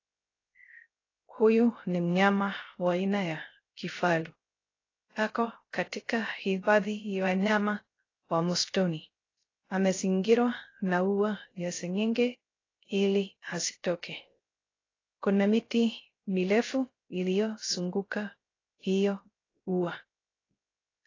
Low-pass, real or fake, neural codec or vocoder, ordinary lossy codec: 7.2 kHz; fake; codec, 16 kHz, 0.3 kbps, FocalCodec; AAC, 32 kbps